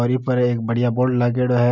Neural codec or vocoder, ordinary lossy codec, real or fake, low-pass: none; none; real; 7.2 kHz